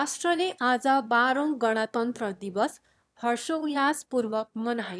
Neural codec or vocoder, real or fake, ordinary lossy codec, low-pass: autoencoder, 22.05 kHz, a latent of 192 numbers a frame, VITS, trained on one speaker; fake; none; none